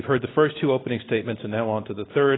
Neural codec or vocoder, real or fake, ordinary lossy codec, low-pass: none; real; AAC, 16 kbps; 7.2 kHz